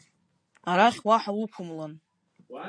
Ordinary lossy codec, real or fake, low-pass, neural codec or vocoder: AAC, 48 kbps; real; 9.9 kHz; none